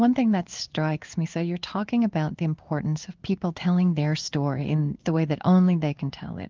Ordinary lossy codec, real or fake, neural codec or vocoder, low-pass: Opus, 24 kbps; fake; codec, 16 kHz in and 24 kHz out, 1 kbps, XY-Tokenizer; 7.2 kHz